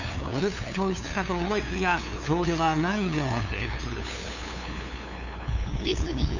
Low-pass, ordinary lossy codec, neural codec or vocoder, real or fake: 7.2 kHz; none; codec, 16 kHz, 2 kbps, FunCodec, trained on LibriTTS, 25 frames a second; fake